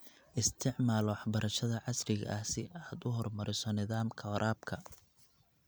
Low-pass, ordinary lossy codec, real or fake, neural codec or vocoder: none; none; real; none